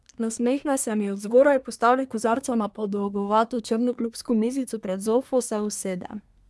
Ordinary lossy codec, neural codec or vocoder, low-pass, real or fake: none; codec, 24 kHz, 1 kbps, SNAC; none; fake